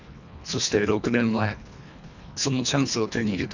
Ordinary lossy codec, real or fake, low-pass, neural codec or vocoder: none; fake; 7.2 kHz; codec, 24 kHz, 1.5 kbps, HILCodec